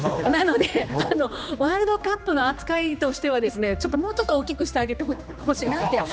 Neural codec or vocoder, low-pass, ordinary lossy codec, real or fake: codec, 16 kHz, 2 kbps, X-Codec, HuBERT features, trained on general audio; none; none; fake